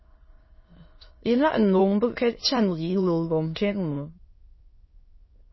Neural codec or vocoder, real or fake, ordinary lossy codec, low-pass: autoencoder, 22.05 kHz, a latent of 192 numbers a frame, VITS, trained on many speakers; fake; MP3, 24 kbps; 7.2 kHz